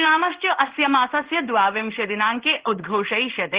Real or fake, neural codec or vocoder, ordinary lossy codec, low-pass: fake; codec, 16 kHz in and 24 kHz out, 1 kbps, XY-Tokenizer; Opus, 16 kbps; 3.6 kHz